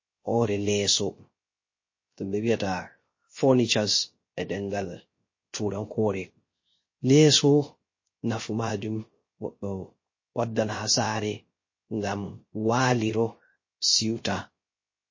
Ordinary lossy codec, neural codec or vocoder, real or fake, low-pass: MP3, 32 kbps; codec, 16 kHz, 0.3 kbps, FocalCodec; fake; 7.2 kHz